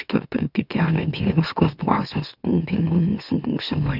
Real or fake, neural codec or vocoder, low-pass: fake; autoencoder, 44.1 kHz, a latent of 192 numbers a frame, MeloTTS; 5.4 kHz